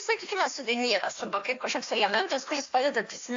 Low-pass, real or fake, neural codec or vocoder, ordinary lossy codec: 7.2 kHz; fake; codec, 16 kHz, 1 kbps, FunCodec, trained on Chinese and English, 50 frames a second; AAC, 48 kbps